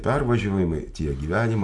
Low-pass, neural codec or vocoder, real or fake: 10.8 kHz; none; real